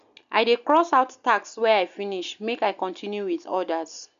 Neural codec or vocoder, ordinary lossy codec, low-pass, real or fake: none; none; 7.2 kHz; real